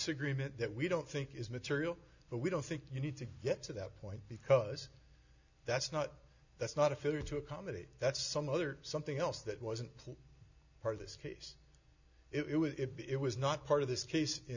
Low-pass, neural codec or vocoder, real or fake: 7.2 kHz; none; real